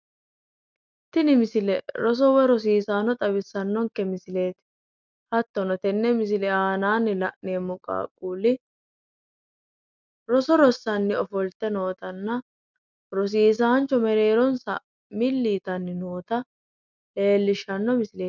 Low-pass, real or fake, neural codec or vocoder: 7.2 kHz; real; none